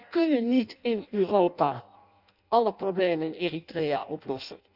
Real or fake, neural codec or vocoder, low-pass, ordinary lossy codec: fake; codec, 16 kHz in and 24 kHz out, 0.6 kbps, FireRedTTS-2 codec; 5.4 kHz; none